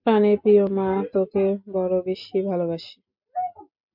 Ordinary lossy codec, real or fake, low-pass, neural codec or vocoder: MP3, 48 kbps; real; 5.4 kHz; none